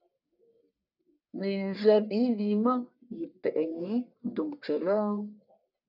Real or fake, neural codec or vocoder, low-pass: fake; codec, 44.1 kHz, 1.7 kbps, Pupu-Codec; 5.4 kHz